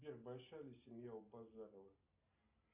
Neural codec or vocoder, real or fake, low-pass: none; real; 3.6 kHz